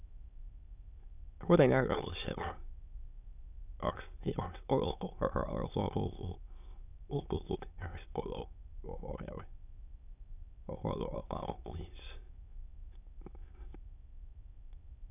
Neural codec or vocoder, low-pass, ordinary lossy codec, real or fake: autoencoder, 22.05 kHz, a latent of 192 numbers a frame, VITS, trained on many speakers; 3.6 kHz; none; fake